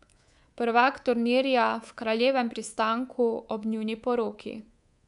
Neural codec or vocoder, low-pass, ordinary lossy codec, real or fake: codec, 24 kHz, 3.1 kbps, DualCodec; 10.8 kHz; none; fake